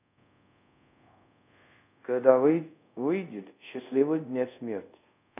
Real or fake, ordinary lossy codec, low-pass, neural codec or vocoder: fake; none; 3.6 kHz; codec, 24 kHz, 0.5 kbps, DualCodec